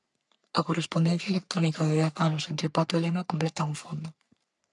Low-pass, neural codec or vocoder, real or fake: 10.8 kHz; codec, 44.1 kHz, 3.4 kbps, Pupu-Codec; fake